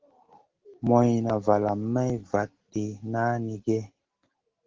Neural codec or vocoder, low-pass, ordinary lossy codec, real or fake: none; 7.2 kHz; Opus, 16 kbps; real